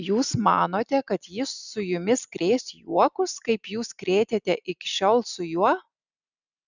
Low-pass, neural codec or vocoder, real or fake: 7.2 kHz; none; real